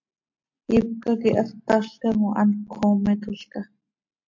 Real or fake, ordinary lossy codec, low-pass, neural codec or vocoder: real; MP3, 48 kbps; 7.2 kHz; none